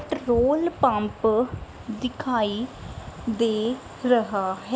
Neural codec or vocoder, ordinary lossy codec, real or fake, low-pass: none; none; real; none